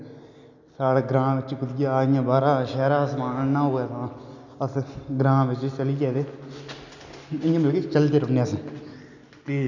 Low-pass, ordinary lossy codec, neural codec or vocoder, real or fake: 7.2 kHz; none; none; real